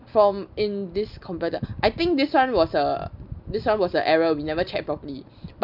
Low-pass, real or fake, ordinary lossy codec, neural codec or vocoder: 5.4 kHz; real; none; none